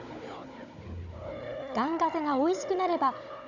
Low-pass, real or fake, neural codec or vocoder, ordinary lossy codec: 7.2 kHz; fake; codec, 16 kHz, 16 kbps, FunCodec, trained on Chinese and English, 50 frames a second; none